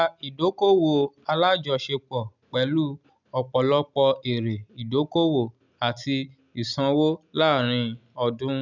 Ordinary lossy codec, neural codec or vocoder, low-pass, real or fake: none; none; 7.2 kHz; real